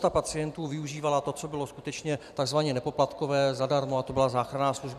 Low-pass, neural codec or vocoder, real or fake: 14.4 kHz; vocoder, 44.1 kHz, 128 mel bands every 256 samples, BigVGAN v2; fake